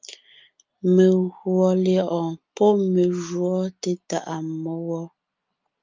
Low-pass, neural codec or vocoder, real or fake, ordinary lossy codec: 7.2 kHz; none; real; Opus, 24 kbps